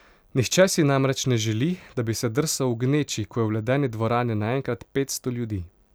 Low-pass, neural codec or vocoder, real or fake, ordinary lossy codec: none; none; real; none